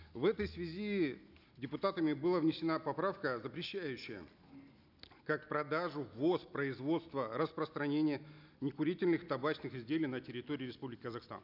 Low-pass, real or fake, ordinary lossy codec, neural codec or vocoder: 5.4 kHz; real; none; none